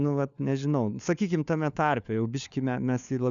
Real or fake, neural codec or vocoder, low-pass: fake; codec, 16 kHz, 4 kbps, FunCodec, trained on LibriTTS, 50 frames a second; 7.2 kHz